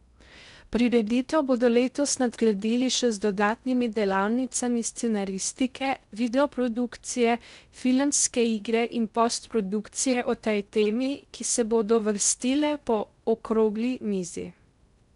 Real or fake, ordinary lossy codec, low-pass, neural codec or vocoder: fake; none; 10.8 kHz; codec, 16 kHz in and 24 kHz out, 0.6 kbps, FocalCodec, streaming, 2048 codes